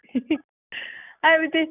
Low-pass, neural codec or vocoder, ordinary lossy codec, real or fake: 3.6 kHz; none; none; real